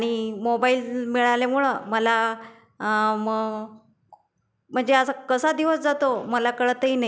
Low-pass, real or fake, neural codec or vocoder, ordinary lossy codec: none; real; none; none